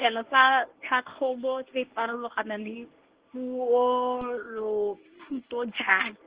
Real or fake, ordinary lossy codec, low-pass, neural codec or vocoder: fake; Opus, 16 kbps; 3.6 kHz; codec, 24 kHz, 0.9 kbps, WavTokenizer, medium speech release version 1